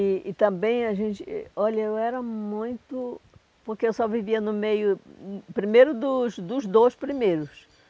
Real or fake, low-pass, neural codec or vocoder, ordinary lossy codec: real; none; none; none